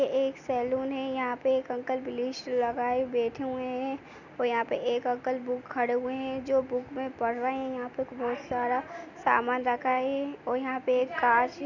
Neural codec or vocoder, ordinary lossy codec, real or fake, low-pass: none; none; real; 7.2 kHz